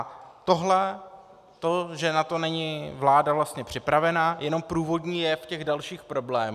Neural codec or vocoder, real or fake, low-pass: none; real; 14.4 kHz